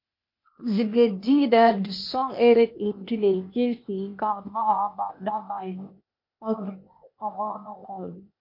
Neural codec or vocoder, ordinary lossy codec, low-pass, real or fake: codec, 16 kHz, 0.8 kbps, ZipCodec; MP3, 32 kbps; 5.4 kHz; fake